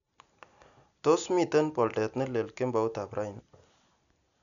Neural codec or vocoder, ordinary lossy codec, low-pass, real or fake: none; none; 7.2 kHz; real